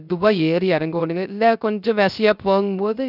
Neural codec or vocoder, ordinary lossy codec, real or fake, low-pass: codec, 16 kHz, 0.3 kbps, FocalCodec; none; fake; 5.4 kHz